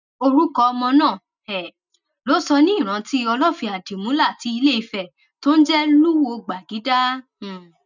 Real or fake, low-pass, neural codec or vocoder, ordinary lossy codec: real; 7.2 kHz; none; none